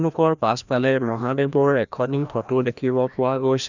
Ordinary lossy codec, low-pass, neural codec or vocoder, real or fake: none; 7.2 kHz; codec, 16 kHz, 1 kbps, FreqCodec, larger model; fake